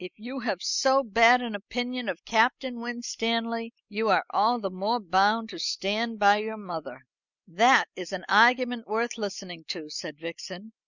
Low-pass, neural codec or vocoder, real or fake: 7.2 kHz; none; real